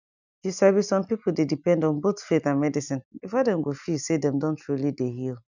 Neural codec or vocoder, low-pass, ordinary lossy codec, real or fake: none; 7.2 kHz; none; real